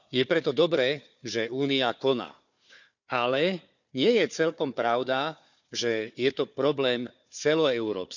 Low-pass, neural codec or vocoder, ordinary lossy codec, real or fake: 7.2 kHz; codec, 16 kHz, 4 kbps, FunCodec, trained on Chinese and English, 50 frames a second; none; fake